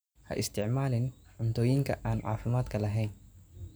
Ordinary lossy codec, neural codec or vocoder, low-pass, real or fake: none; none; none; real